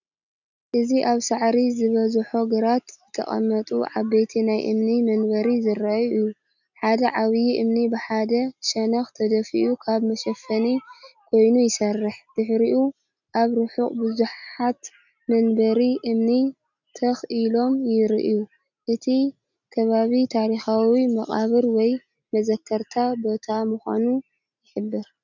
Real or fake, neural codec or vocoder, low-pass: real; none; 7.2 kHz